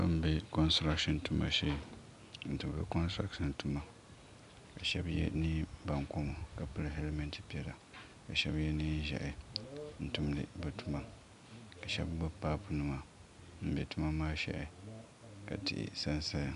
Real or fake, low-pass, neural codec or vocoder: real; 10.8 kHz; none